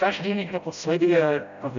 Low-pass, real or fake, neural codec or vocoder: 7.2 kHz; fake; codec, 16 kHz, 0.5 kbps, FreqCodec, smaller model